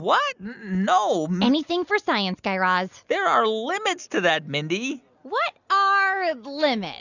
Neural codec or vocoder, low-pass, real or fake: none; 7.2 kHz; real